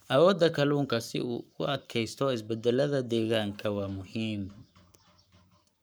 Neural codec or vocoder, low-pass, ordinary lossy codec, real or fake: codec, 44.1 kHz, 7.8 kbps, Pupu-Codec; none; none; fake